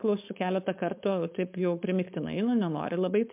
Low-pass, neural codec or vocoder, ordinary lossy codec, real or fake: 3.6 kHz; codec, 16 kHz, 4.8 kbps, FACodec; MP3, 32 kbps; fake